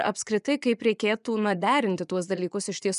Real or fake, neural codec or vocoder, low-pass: fake; vocoder, 24 kHz, 100 mel bands, Vocos; 10.8 kHz